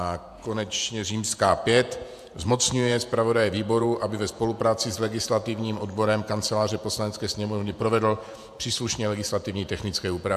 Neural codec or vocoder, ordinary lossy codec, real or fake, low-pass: vocoder, 48 kHz, 128 mel bands, Vocos; AAC, 96 kbps; fake; 14.4 kHz